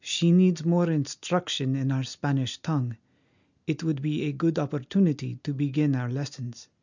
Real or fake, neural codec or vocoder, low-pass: real; none; 7.2 kHz